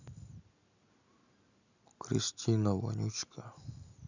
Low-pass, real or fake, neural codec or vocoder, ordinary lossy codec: 7.2 kHz; real; none; none